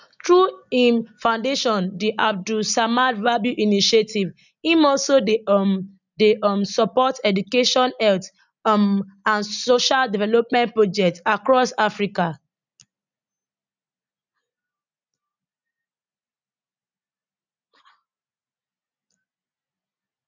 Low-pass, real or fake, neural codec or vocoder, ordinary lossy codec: 7.2 kHz; real; none; none